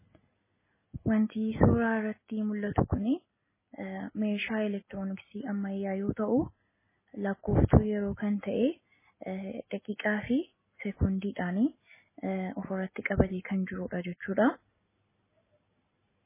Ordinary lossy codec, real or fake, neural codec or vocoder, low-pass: MP3, 16 kbps; real; none; 3.6 kHz